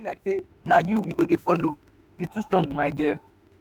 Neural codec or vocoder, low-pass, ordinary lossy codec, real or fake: autoencoder, 48 kHz, 32 numbers a frame, DAC-VAE, trained on Japanese speech; none; none; fake